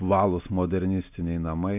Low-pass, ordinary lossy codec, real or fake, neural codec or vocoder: 3.6 kHz; MP3, 32 kbps; real; none